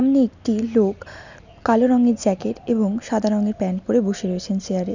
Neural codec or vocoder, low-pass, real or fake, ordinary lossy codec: none; 7.2 kHz; real; none